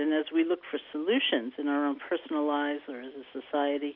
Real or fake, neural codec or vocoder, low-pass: real; none; 5.4 kHz